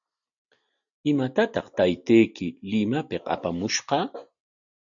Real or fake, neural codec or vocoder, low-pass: real; none; 7.2 kHz